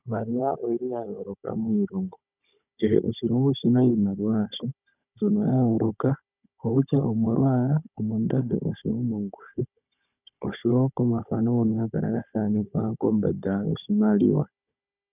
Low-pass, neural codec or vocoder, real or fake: 3.6 kHz; codec, 16 kHz, 16 kbps, FunCodec, trained on Chinese and English, 50 frames a second; fake